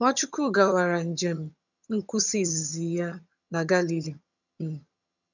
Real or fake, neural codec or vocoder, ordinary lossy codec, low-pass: fake; vocoder, 22.05 kHz, 80 mel bands, HiFi-GAN; none; 7.2 kHz